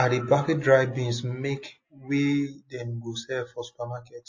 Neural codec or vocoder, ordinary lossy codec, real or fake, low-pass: none; MP3, 32 kbps; real; 7.2 kHz